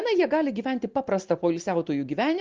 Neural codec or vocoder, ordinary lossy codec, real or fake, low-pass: none; Opus, 32 kbps; real; 7.2 kHz